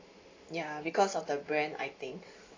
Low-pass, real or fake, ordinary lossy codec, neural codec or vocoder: 7.2 kHz; real; AAC, 32 kbps; none